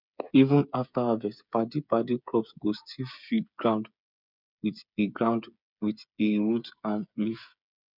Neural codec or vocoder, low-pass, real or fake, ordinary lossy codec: codec, 16 kHz, 8 kbps, FreqCodec, smaller model; 5.4 kHz; fake; none